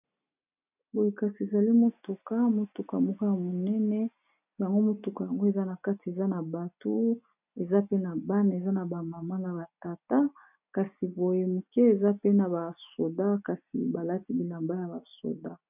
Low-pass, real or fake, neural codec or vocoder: 3.6 kHz; real; none